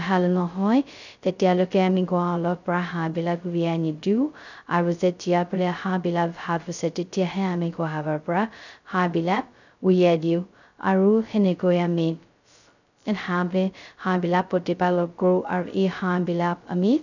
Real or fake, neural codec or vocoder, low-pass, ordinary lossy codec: fake; codec, 16 kHz, 0.2 kbps, FocalCodec; 7.2 kHz; none